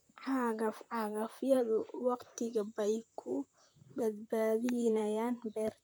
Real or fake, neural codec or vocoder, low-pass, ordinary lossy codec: fake; vocoder, 44.1 kHz, 128 mel bands, Pupu-Vocoder; none; none